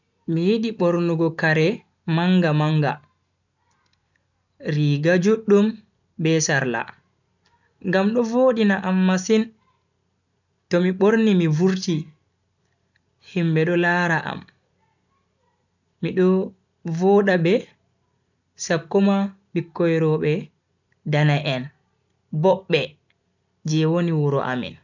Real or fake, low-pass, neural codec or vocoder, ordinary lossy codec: real; 7.2 kHz; none; none